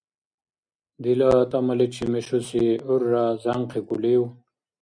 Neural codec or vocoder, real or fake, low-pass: none; real; 9.9 kHz